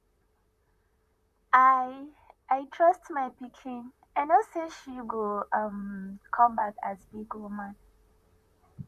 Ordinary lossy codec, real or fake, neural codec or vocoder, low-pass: none; fake; vocoder, 44.1 kHz, 128 mel bands, Pupu-Vocoder; 14.4 kHz